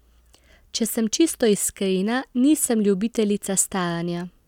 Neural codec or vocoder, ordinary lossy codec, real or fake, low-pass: none; none; real; 19.8 kHz